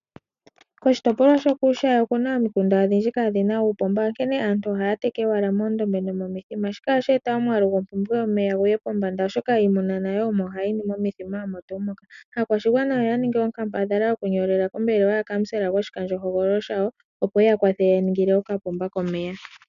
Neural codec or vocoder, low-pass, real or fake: none; 7.2 kHz; real